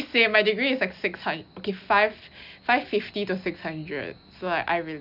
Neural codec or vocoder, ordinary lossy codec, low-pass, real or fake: none; none; 5.4 kHz; real